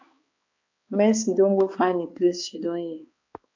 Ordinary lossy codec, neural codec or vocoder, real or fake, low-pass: AAC, 48 kbps; codec, 16 kHz, 2 kbps, X-Codec, HuBERT features, trained on balanced general audio; fake; 7.2 kHz